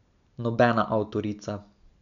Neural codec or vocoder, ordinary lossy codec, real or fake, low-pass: none; none; real; 7.2 kHz